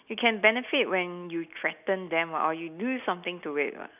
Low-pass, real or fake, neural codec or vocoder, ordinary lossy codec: 3.6 kHz; real; none; none